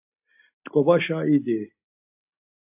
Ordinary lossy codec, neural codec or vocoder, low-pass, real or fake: AAC, 32 kbps; none; 3.6 kHz; real